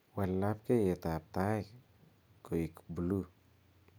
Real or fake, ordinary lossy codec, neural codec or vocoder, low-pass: real; none; none; none